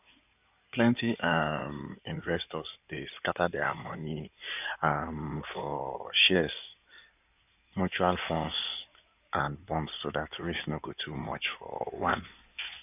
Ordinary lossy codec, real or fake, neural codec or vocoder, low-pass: none; fake; codec, 16 kHz in and 24 kHz out, 2.2 kbps, FireRedTTS-2 codec; 3.6 kHz